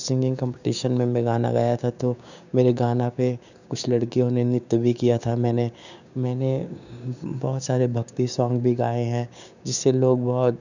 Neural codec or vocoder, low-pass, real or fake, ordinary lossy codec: codec, 16 kHz, 6 kbps, DAC; 7.2 kHz; fake; none